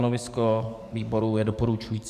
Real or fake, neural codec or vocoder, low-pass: fake; codec, 44.1 kHz, 7.8 kbps, DAC; 14.4 kHz